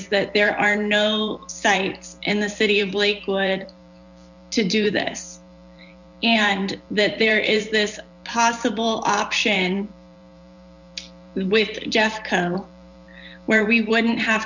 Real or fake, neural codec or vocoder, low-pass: fake; vocoder, 44.1 kHz, 128 mel bands every 512 samples, BigVGAN v2; 7.2 kHz